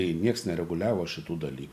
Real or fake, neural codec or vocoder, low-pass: real; none; 14.4 kHz